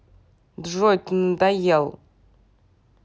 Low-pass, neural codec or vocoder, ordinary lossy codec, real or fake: none; none; none; real